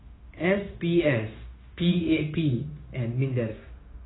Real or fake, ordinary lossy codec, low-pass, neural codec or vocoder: fake; AAC, 16 kbps; 7.2 kHz; codec, 16 kHz, 0.9 kbps, LongCat-Audio-Codec